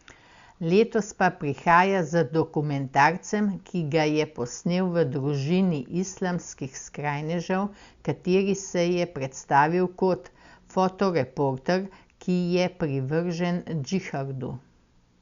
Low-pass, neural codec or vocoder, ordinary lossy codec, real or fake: 7.2 kHz; none; Opus, 64 kbps; real